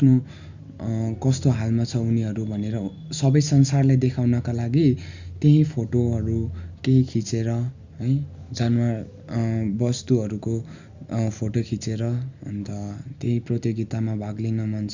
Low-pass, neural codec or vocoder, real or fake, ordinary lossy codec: 7.2 kHz; none; real; Opus, 64 kbps